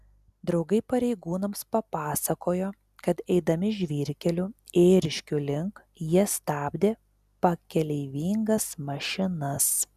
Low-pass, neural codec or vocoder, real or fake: 14.4 kHz; none; real